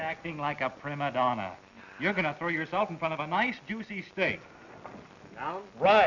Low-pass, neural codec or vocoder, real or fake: 7.2 kHz; none; real